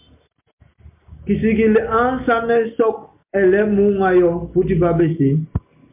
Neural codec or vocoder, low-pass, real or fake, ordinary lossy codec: none; 3.6 kHz; real; MP3, 32 kbps